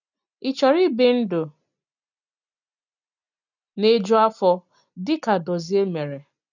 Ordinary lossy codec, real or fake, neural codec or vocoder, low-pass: none; real; none; 7.2 kHz